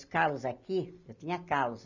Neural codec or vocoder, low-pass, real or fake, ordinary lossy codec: none; 7.2 kHz; real; none